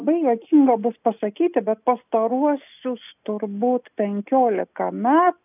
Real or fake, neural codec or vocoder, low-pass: real; none; 3.6 kHz